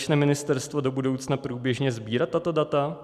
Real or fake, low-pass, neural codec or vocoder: real; 14.4 kHz; none